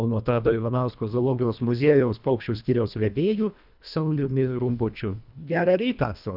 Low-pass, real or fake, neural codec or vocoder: 5.4 kHz; fake; codec, 24 kHz, 1.5 kbps, HILCodec